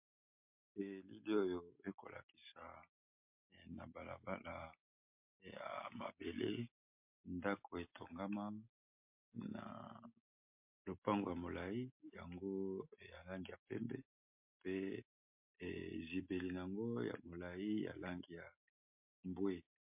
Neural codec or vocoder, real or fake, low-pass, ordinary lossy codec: none; real; 3.6 kHz; MP3, 32 kbps